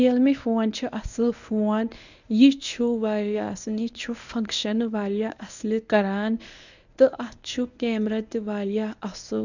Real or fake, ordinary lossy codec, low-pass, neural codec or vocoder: fake; none; 7.2 kHz; codec, 24 kHz, 0.9 kbps, WavTokenizer, medium speech release version 1